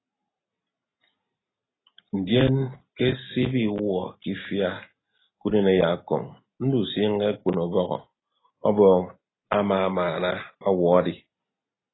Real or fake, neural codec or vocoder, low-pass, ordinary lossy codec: real; none; 7.2 kHz; AAC, 16 kbps